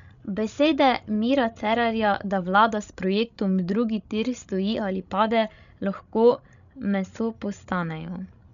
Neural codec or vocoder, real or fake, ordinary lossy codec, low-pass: codec, 16 kHz, 16 kbps, FreqCodec, larger model; fake; none; 7.2 kHz